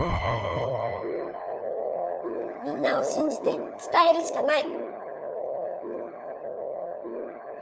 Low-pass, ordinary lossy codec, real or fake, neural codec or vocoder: none; none; fake; codec, 16 kHz, 4 kbps, FunCodec, trained on LibriTTS, 50 frames a second